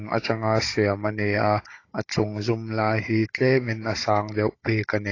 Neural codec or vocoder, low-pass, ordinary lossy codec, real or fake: codec, 16 kHz, 16 kbps, FunCodec, trained on Chinese and English, 50 frames a second; 7.2 kHz; AAC, 32 kbps; fake